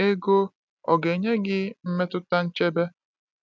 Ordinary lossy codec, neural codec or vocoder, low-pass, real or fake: none; none; none; real